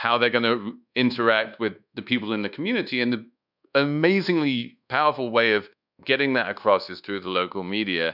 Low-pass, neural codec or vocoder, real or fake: 5.4 kHz; codec, 24 kHz, 1.2 kbps, DualCodec; fake